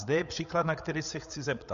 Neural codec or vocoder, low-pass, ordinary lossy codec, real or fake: codec, 16 kHz, 8 kbps, FreqCodec, larger model; 7.2 kHz; MP3, 48 kbps; fake